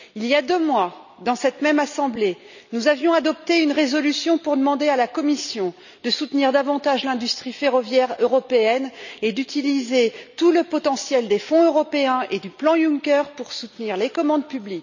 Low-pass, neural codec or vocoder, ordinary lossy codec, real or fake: 7.2 kHz; none; none; real